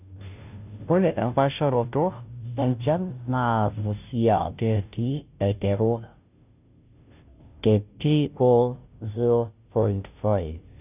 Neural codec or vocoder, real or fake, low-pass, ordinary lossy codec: codec, 16 kHz, 0.5 kbps, FunCodec, trained on Chinese and English, 25 frames a second; fake; 3.6 kHz; none